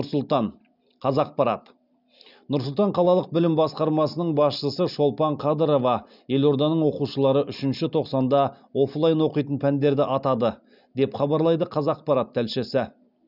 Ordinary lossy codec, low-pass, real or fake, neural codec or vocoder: none; 5.4 kHz; real; none